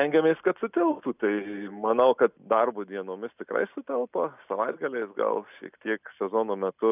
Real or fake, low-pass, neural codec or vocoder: real; 3.6 kHz; none